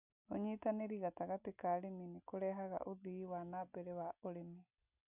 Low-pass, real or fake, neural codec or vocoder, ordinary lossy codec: 3.6 kHz; real; none; none